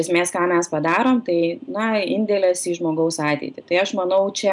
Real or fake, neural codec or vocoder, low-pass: real; none; 10.8 kHz